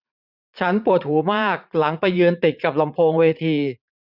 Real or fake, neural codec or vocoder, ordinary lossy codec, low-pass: fake; vocoder, 44.1 kHz, 80 mel bands, Vocos; none; 5.4 kHz